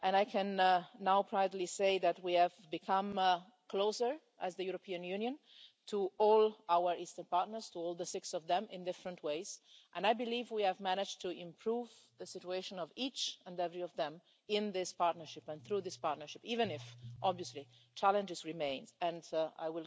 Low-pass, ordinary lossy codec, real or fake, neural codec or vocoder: none; none; real; none